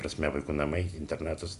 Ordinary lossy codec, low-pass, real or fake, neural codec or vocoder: AAC, 96 kbps; 10.8 kHz; real; none